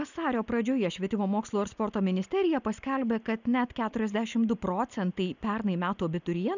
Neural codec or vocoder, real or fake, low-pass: none; real; 7.2 kHz